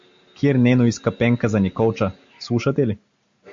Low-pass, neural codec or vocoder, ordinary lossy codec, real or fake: 7.2 kHz; none; AAC, 64 kbps; real